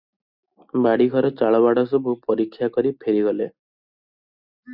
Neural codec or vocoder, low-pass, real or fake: none; 5.4 kHz; real